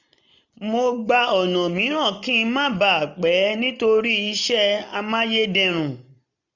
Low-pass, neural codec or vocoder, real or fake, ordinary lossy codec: 7.2 kHz; none; real; none